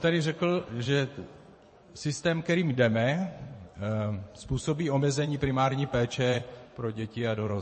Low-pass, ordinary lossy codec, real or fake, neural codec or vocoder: 10.8 kHz; MP3, 32 kbps; fake; vocoder, 24 kHz, 100 mel bands, Vocos